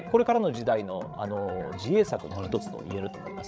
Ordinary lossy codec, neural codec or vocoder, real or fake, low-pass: none; codec, 16 kHz, 16 kbps, FreqCodec, larger model; fake; none